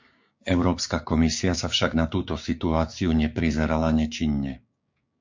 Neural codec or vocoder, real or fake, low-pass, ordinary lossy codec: codec, 16 kHz, 6 kbps, DAC; fake; 7.2 kHz; MP3, 48 kbps